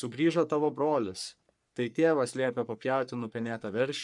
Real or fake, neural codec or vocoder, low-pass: fake; codec, 44.1 kHz, 3.4 kbps, Pupu-Codec; 10.8 kHz